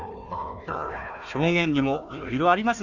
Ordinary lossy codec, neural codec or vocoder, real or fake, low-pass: none; codec, 16 kHz, 1 kbps, FunCodec, trained on Chinese and English, 50 frames a second; fake; 7.2 kHz